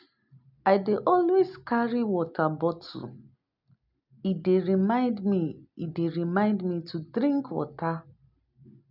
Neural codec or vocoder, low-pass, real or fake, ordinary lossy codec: none; 5.4 kHz; real; none